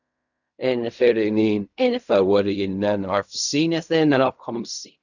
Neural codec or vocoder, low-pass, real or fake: codec, 16 kHz in and 24 kHz out, 0.4 kbps, LongCat-Audio-Codec, fine tuned four codebook decoder; 7.2 kHz; fake